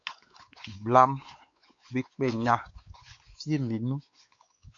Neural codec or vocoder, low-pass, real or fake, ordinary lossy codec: codec, 16 kHz, 4 kbps, X-Codec, WavLM features, trained on Multilingual LibriSpeech; 7.2 kHz; fake; AAC, 48 kbps